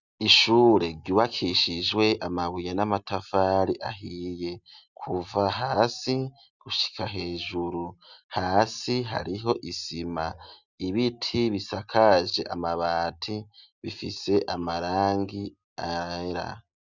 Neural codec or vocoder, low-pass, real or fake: none; 7.2 kHz; real